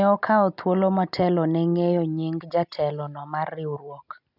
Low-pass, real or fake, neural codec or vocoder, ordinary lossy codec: 5.4 kHz; real; none; none